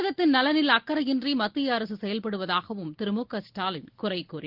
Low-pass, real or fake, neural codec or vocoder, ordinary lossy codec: 5.4 kHz; real; none; Opus, 16 kbps